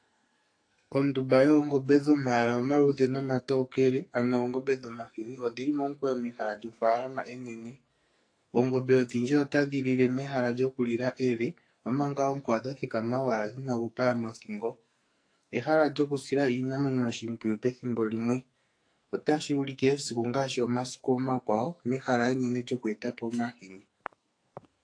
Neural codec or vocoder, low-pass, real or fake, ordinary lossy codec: codec, 44.1 kHz, 2.6 kbps, SNAC; 9.9 kHz; fake; AAC, 48 kbps